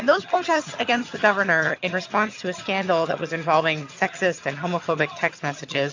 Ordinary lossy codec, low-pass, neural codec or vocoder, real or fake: AAC, 48 kbps; 7.2 kHz; vocoder, 22.05 kHz, 80 mel bands, HiFi-GAN; fake